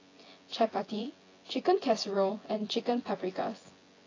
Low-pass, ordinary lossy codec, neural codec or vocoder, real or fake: 7.2 kHz; AAC, 32 kbps; vocoder, 24 kHz, 100 mel bands, Vocos; fake